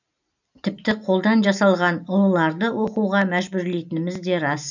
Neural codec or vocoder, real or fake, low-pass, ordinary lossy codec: none; real; 7.2 kHz; none